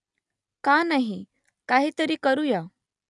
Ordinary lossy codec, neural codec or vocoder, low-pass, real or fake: none; none; 10.8 kHz; real